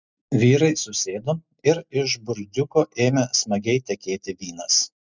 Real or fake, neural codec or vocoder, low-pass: real; none; 7.2 kHz